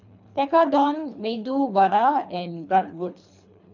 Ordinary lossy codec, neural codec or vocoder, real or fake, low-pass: none; codec, 24 kHz, 3 kbps, HILCodec; fake; 7.2 kHz